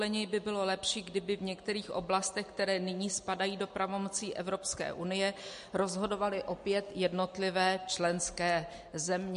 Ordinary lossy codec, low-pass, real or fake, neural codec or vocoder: MP3, 48 kbps; 10.8 kHz; real; none